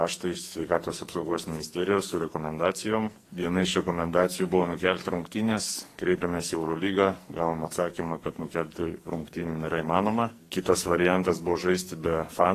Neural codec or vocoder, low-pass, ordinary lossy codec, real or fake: codec, 44.1 kHz, 2.6 kbps, SNAC; 14.4 kHz; AAC, 48 kbps; fake